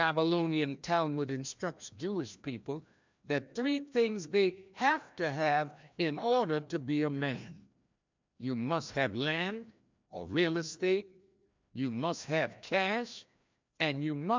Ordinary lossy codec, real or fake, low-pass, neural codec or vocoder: MP3, 64 kbps; fake; 7.2 kHz; codec, 16 kHz, 1 kbps, FreqCodec, larger model